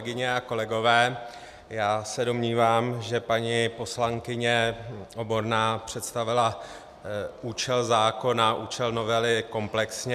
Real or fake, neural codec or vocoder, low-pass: real; none; 14.4 kHz